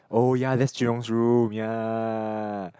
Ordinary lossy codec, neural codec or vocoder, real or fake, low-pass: none; none; real; none